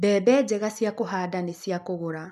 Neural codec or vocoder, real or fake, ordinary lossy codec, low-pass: none; real; MP3, 96 kbps; 14.4 kHz